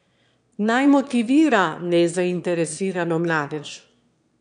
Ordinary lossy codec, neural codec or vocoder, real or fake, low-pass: none; autoencoder, 22.05 kHz, a latent of 192 numbers a frame, VITS, trained on one speaker; fake; 9.9 kHz